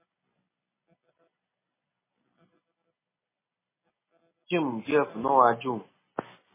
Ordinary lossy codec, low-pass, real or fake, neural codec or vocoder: MP3, 16 kbps; 3.6 kHz; real; none